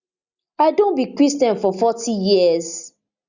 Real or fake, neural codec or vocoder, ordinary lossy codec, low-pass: real; none; Opus, 64 kbps; 7.2 kHz